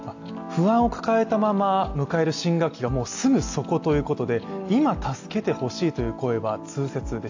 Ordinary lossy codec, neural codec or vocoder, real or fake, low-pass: AAC, 48 kbps; none; real; 7.2 kHz